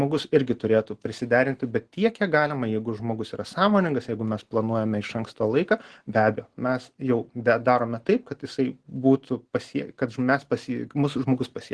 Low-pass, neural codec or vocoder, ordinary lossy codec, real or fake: 10.8 kHz; none; Opus, 16 kbps; real